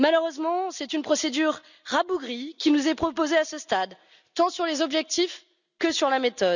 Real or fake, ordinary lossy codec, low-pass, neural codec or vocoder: real; none; 7.2 kHz; none